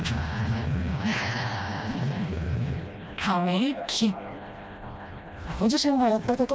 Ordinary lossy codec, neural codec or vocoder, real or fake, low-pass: none; codec, 16 kHz, 1 kbps, FreqCodec, smaller model; fake; none